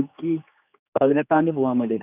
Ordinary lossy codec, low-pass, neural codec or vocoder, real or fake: none; 3.6 kHz; codec, 16 kHz, 2 kbps, X-Codec, HuBERT features, trained on general audio; fake